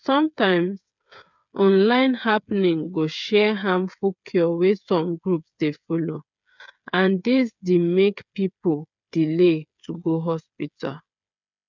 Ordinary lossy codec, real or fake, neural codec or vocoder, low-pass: none; fake; codec, 16 kHz, 8 kbps, FreqCodec, smaller model; 7.2 kHz